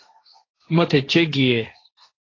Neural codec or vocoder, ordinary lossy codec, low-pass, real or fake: codec, 16 kHz, 1.1 kbps, Voila-Tokenizer; AAC, 32 kbps; 7.2 kHz; fake